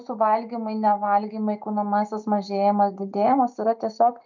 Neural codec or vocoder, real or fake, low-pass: none; real; 7.2 kHz